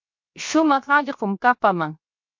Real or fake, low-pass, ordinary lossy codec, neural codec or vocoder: fake; 7.2 kHz; MP3, 48 kbps; codec, 16 kHz, 0.7 kbps, FocalCodec